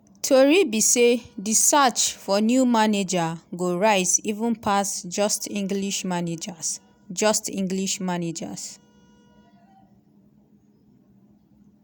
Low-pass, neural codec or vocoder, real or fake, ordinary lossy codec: none; none; real; none